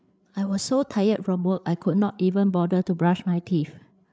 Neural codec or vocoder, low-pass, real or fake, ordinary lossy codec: codec, 16 kHz, 8 kbps, FreqCodec, larger model; none; fake; none